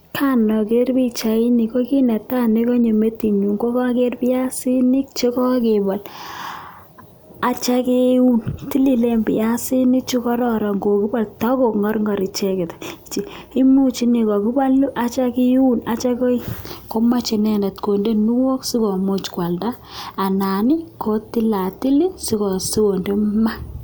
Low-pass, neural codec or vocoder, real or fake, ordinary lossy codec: none; none; real; none